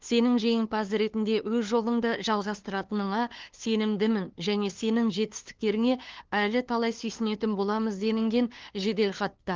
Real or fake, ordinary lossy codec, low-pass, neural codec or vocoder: fake; Opus, 16 kbps; 7.2 kHz; codec, 16 kHz, 2 kbps, FunCodec, trained on LibriTTS, 25 frames a second